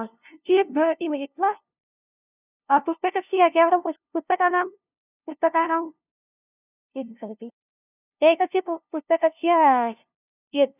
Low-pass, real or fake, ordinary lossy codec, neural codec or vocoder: 3.6 kHz; fake; none; codec, 16 kHz, 0.5 kbps, FunCodec, trained on LibriTTS, 25 frames a second